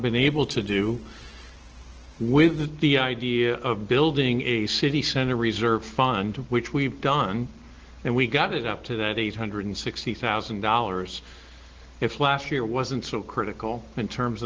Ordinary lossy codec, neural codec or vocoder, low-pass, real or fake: Opus, 16 kbps; none; 7.2 kHz; real